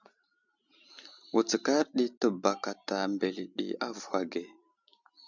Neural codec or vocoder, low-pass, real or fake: none; 7.2 kHz; real